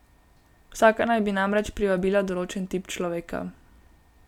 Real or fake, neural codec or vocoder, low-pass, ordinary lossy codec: real; none; 19.8 kHz; none